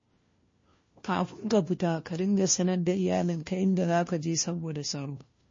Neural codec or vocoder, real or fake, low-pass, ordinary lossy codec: codec, 16 kHz, 1 kbps, FunCodec, trained on LibriTTS, 50 frames a second; fake; 7.2 kHz; MP3, 32 kbps